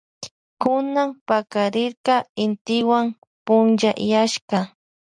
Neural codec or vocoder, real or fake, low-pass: none; real; 9.9 kHz